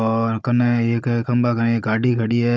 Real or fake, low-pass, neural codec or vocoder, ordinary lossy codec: fake; none; codec, 16 kHz, 16 kbps, FunCodec, trained on Chinese and English, 50 frames a second; none